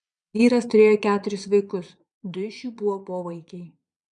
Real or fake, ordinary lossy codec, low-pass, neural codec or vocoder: fake; MP3, 96 kbps; 9.9 kHz; vocoder, 22.05 kHz, 80 mel bands, Vocos